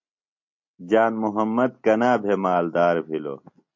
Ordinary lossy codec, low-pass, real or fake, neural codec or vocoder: MP3, 48 kbps; 7.2 kHz; real; none